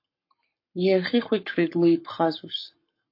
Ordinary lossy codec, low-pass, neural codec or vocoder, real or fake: MP3, 32 kbps; 5.4 kHz; codec, 44.1 kHz, 7.8 kbps, Pupu-Codec; fake